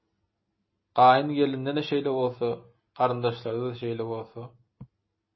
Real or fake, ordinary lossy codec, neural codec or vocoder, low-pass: real; MP3, 24 kbps; none; 7.2 kHz